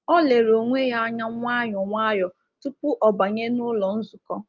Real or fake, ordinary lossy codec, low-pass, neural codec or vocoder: real; Opus, 32 kbps; 7.2 kHz; none